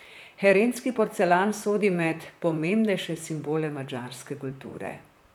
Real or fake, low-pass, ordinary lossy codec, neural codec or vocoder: fake; 19.8 kHz; none; vocoder, 44.1 kHz, 128 mel bands, Pupu-Vocoder